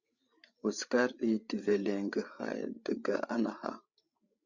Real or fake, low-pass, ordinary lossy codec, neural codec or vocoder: fake; 7.2 kHz; Opus, 64 kbps; codec, 16 kHz, 8 kbps, FreqCodec, larger model